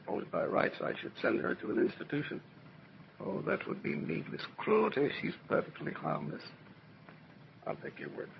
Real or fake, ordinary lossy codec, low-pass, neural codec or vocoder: fake; MP3, 24 kbps; 7.2 kHz; vocoder, 22.05 kHz, 80 mel bands, HiFi-GAN